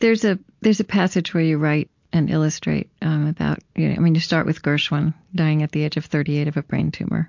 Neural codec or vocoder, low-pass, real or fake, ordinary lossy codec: none; 7.2 kHz; real; MP3, 48 kbps